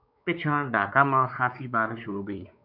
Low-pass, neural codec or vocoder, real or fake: 5.4 kHz; codec, 16 kHz, 4 kbps, X-Codec, WavLM features, trained on Multilingual LibriSpeech; fake